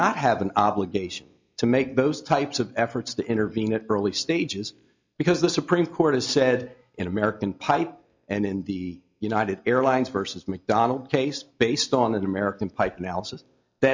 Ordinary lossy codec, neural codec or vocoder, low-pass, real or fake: MP3, 64 kbps; none; 7.2 kHz; real